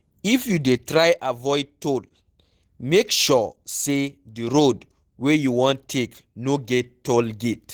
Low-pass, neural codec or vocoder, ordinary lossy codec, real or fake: 19.8 kHz; none; Opus, 16 kbps; real